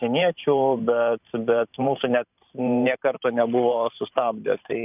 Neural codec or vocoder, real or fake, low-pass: vocoder, 44.1 kHz, 128 mel bands every 512 samples, BigVGAN v2; fake; 3.6 kHz